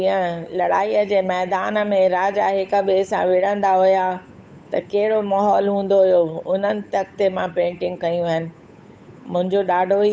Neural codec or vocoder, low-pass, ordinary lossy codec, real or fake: codec, 16 kHz, 8 kbps, FunCodec, trained on Chinese and English, 25 frames a second; none; none; fake